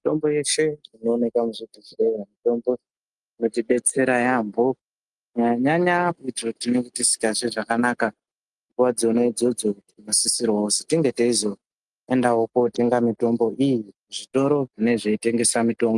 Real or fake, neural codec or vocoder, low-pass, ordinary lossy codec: real; none; 10.8 kHz; Opus, 32 kbps